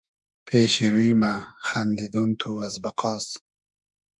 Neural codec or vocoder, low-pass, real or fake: autoencoder, 48 kHz, 32 numbers a frame, DAC-VAE, trained on Japanese speech; 10.8 kHz; fake